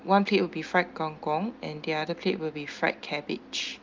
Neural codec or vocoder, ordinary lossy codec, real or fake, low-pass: none; Opus, 24 kbps; real; 7.2 kHz